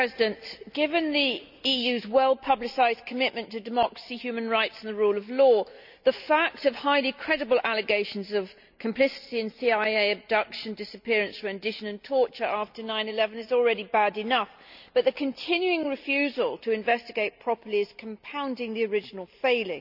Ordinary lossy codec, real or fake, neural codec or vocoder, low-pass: none; real; none; 5.4 kHz